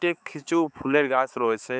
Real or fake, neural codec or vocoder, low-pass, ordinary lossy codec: fake; codec, 16 kHz, 4 kbps, X-Codec, HuBERT features, trained on balanced general audio; none; none